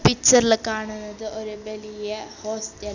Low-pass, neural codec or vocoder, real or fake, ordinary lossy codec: 7.2 kHz; none; real; none